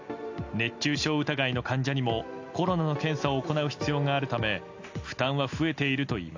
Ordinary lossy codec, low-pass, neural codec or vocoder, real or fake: AAC, 48 kbps; 7.2 kHz; none; real